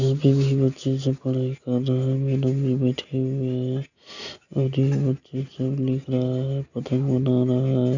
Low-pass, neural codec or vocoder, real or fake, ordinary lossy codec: 7.2 kHz; none; real; none